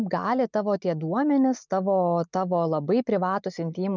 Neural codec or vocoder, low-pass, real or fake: none; 7.2 kHz; real